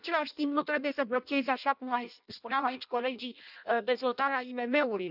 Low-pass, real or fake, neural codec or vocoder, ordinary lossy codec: 5.4 kHz; fake; codec, 16 kHz in and 24 kHz out, 0.6 kbps, FireRedTTS-2 codec; none